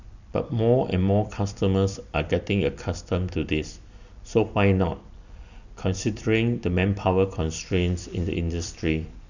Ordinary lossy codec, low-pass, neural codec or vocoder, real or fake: none; 7.2 kHz; vocoder, 44.1 kHz, 128 mel bands every 256 samples, BigVGAN v2; fake